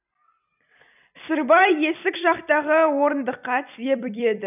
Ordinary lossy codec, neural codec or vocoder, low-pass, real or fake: none; vocoder, 44.1 kHz, 128 mel bands every 256 samples, BigVGAN v2; 3.6 kHz; fake